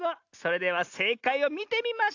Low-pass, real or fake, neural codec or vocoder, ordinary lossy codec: 7.2 kHz; real; none; AAC, 48 kbps